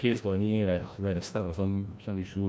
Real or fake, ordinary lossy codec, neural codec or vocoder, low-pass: fake; none; codec, 16 kHz, 1 kbps, FreqCodec, larger model; none